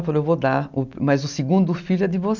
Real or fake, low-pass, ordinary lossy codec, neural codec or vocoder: real; 7.2 kHz; none; none